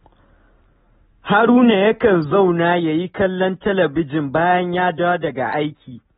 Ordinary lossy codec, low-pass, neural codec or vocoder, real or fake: AAC, 16 kbps; 9.9 kHz; none; real